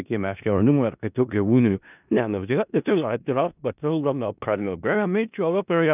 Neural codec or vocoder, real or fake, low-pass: codec, 16 kHz in and 24 kHz out, 0.4 kbps, LongCat-Audio-Codec, four codebook decoder; fake; 3.6 kHz